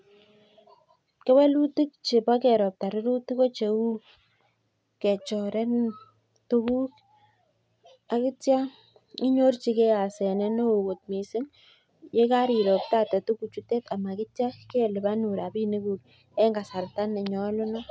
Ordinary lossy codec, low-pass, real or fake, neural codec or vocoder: none; none; real; none